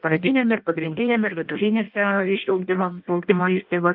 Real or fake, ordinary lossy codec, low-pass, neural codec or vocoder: fake; Opus, 24 kbps; 5.4 kHz; codec, 16 kHz in and 24 kHz out, 0.6 kbps, FireRedTTS-2 codec